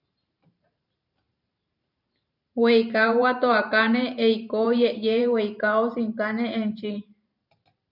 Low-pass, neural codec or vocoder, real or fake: 5.4 kHz; vocoder, 44.1 kHz, 128 mel bands every 512 samples, BigVGAN v2; fake